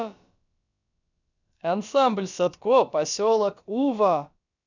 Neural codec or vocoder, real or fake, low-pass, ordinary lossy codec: codec, 16 kHz, about 1 kbps, DyCAST, with the encoder's durations; fake; 7.2 kHz; none